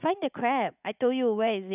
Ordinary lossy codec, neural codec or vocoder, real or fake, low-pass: none; vocoder, 44.1 kHz, 80 mel bands, Vocos; fake; 3.6 kHz